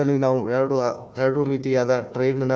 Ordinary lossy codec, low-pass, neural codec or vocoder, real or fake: none; none; codec, 16 kHz, 1 kbps, FunCodec, trained on Chinese and English, 50 frames a second; fake